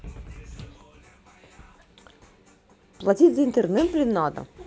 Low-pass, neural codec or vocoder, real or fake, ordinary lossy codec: none; none; real; none